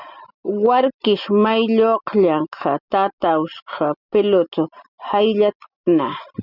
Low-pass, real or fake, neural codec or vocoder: 5.4 kHz; real; none